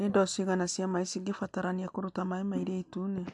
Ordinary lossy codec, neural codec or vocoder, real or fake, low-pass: AAC, 64 kbps; none; real; 10.8 kHz